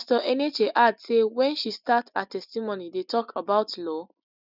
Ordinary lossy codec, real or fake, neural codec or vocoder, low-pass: none; real; none; 5.4 kHz